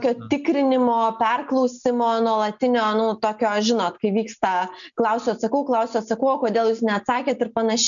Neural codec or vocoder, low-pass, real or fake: none; 7.2 kHz; real